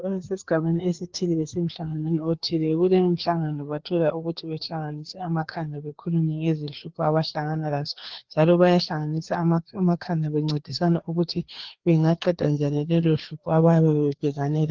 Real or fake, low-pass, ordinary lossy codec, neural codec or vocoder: fake; 7.2 kHz; Opus, 16 kbps; codec, 16 kHz, 2 kbps, FreqCodec, larger model